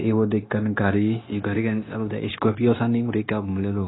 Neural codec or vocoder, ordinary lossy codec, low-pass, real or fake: codec, 16 kHz, 0.9 kbps, LongCat-Audio-Codec; AAC, 16 kbps; 7.2 kHz; fake